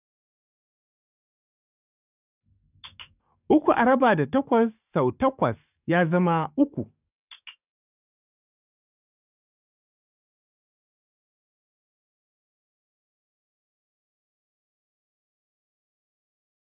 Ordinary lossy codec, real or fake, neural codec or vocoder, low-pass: none; fake; codec, 44.1 kHz, 7.8 kbps, DAC; 3.6 kHz